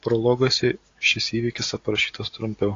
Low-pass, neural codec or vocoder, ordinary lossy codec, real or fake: 7.2 kHz; none; AAC, 32 kbps; real